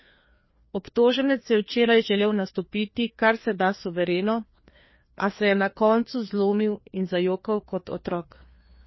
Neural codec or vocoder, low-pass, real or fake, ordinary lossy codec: codec, 16 kHz, 2 kbps, FreqCodec, larger model; 7.2 kHz; fake; MP3, 24 kbps